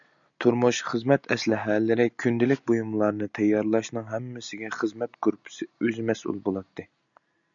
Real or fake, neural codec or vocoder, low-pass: real; none; 7.2 kHz